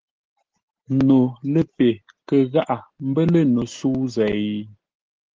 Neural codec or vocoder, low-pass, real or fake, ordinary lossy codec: none; 7.2 kHz; real; Opus, 16 kbps